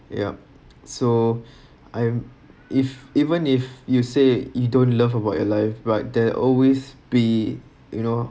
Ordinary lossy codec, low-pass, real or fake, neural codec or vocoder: none; none; real; none